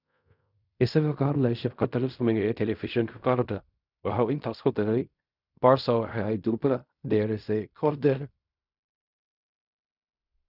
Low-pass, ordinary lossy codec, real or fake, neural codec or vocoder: 5.4 kHz; none; fake; codec, 16 kHz in and 24 kHz out, 0.4 kbps, LongCat-Audio-Codec, fine tuned four codebook decoder